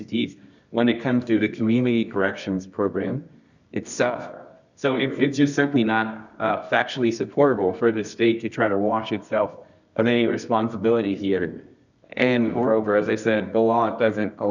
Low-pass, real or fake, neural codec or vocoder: 7.2 kHz; fake; codec, 24 kHz, 0.9 kbps, WavTokenizer, medium music audio release